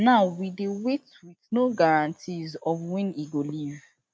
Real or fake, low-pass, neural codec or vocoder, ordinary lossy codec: real; none; none; none